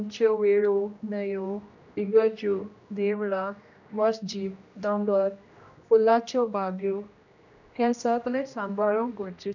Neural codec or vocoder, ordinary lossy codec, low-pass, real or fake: codec, 16 kHz, 1 kbps, X-Codec, HuBERT features, trained on general audio; none; 7.2 kHz; fake